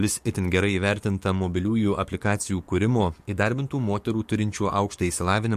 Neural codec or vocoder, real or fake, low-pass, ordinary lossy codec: codec, 44.1 kHz, 7.8 kbps, DAC; fake; 14.4 kHz; MP3, 64 kbps